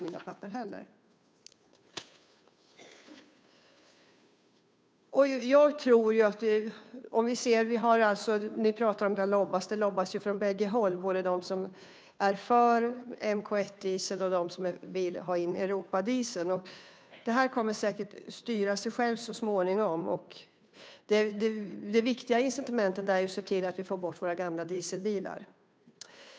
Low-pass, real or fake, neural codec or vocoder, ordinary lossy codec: none; fake; codec, 16 kHz, 2 kbps, FunCodec, trained on Chinese and English, 25 frames a second; none